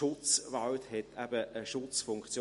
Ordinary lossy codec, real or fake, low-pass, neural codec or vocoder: MP3, 48 kbps; real; 14.4 kHz; none